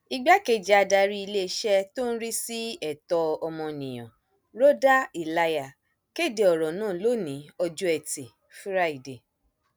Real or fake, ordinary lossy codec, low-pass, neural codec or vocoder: real; none; none; none